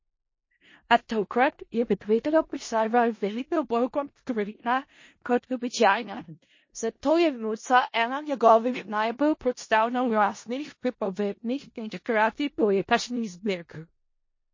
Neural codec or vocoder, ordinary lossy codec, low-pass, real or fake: codec, 16 kHz in and 24 kHz out, 0.4 kbps, LongCat-Audio-Codec, four codebook decoder; MP3, 32 kbps; 7.2 kHz; fake